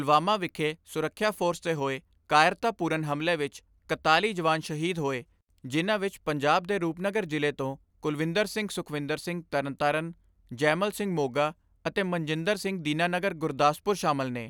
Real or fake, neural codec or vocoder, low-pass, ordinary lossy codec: real; none; none; none